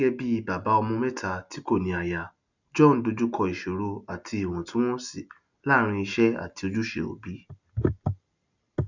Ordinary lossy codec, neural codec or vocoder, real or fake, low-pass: none; none; real; 7.2 kHz